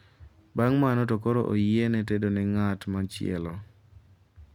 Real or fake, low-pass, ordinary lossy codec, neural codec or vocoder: real; 19.8 kHz; none; none